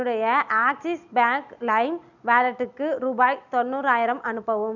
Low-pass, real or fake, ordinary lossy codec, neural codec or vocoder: 7.2 kHz; real; none; none